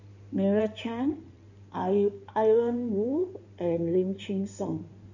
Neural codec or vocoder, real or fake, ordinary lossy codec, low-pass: codec, 16 kHz in and 24 kHz out, 2.2 kbps, FireRedTTS-2 codec; fake; none; 7.2 kHz